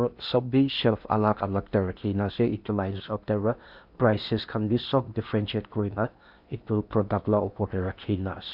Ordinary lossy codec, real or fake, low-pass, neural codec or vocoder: none; fake; 5.4 kHz; codec, 16 kHz in and 24 kHz out, 0.8 kbps, FocalCodec, streaming, 65536 codes